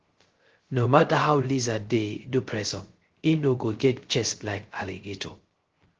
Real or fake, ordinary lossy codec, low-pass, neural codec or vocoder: fake; Opus, 16 kbps; 7.2 kHz; codec, 16 kHz, 0.3 kbps, FocalCodec